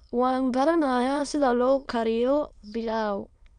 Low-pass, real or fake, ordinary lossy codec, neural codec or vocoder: 9.9 kHz; fake; none; autoencoder, 22.05 kHz, a latent of 192 numbers a frame, VITS, trained on many speakers